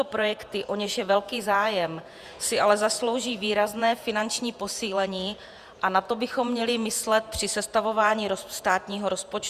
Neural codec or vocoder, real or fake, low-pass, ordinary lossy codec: vocoder, 48 kHz, 128 mel bands, Vocos; fake; 14.4 kHz; Opus, 64 kbps